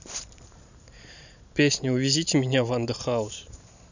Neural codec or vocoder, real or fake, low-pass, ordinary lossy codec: vocoder, 44.1 kHz, 128 mel bands every 512 samples, BigVGAN v2; fake; 7.2 kHz; none